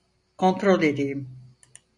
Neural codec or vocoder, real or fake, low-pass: none; real; 10.8 kHz